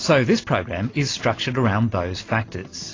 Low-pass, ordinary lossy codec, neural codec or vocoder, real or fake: 7.2 kHz; AAC, 32 kbps; none; real